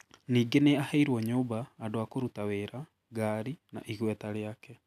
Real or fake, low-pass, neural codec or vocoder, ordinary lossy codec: real; 14.4 kHz; none; none